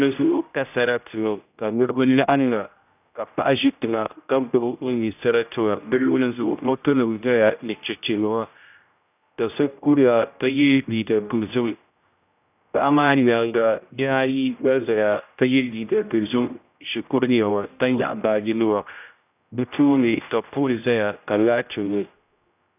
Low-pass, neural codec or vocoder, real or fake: 3.6 kHz; codec, 16 kHz, 0.5 kbps, X-Codec, HuBERT features, trained on general audio; fake